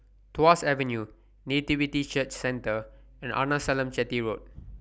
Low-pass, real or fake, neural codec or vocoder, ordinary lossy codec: none; real; none; none